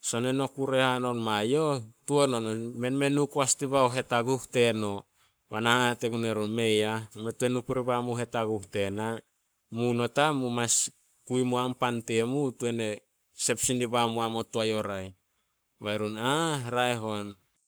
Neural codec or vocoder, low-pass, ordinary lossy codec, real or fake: codec, 44.1 kHz, 7.8 kbps, Pupu-Codec; none; none; fake